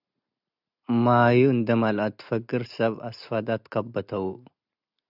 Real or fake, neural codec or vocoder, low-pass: real; none; 5.4 kHz